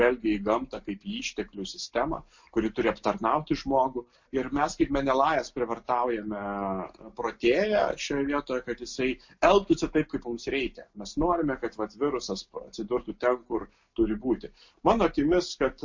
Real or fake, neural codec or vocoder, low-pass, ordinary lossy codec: real; none; 7.2 kHz; MP3, 48 kbps